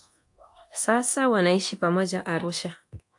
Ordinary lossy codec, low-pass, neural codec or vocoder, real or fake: AAC, 64 kbps; 10.8 kHz; codec, 24 kHz, 0.9 kbps, DualCodec; fake